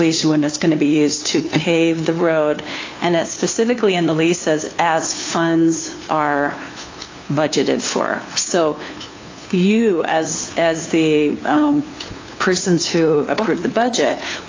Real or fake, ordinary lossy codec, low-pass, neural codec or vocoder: fake; AAC, 32 kbps; 7.2 kHz; codec, 16 kHz, 2 kbps, FunCodec, trained on LibriTTS, 25 frames a second